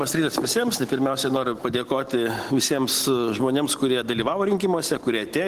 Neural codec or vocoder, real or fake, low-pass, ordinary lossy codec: none; real; 14.4 kHz; Opus, 16 kbps